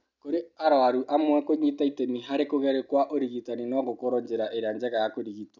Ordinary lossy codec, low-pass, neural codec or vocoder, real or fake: none; 7.2 kHz; none; real